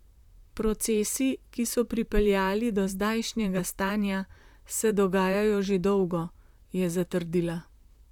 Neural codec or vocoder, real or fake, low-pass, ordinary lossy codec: vocoder, 44.1 kHz, 128 mel bands, Pupu-Vocoder; fake; 19.8 kHz; none